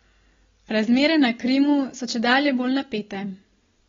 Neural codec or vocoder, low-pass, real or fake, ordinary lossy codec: none; 7.2 kHz; real; AAC, 24 kbps